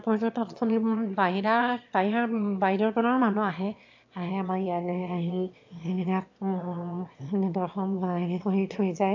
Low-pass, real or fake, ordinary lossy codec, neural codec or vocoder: 7.2 kHz; fake; AAC, 48 kbps; autoencoder, 22.05 kHz, a latent of 192 numbers a frame, VITS, trained on one speaker